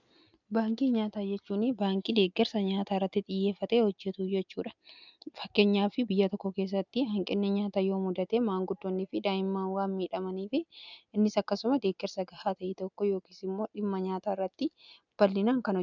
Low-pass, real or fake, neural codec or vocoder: 7.2 kHz; real; none